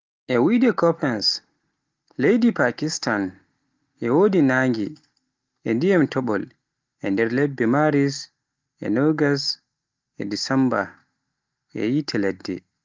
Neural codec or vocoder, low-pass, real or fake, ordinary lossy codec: none; 7.2 kHz; real; Opus, 24 kbps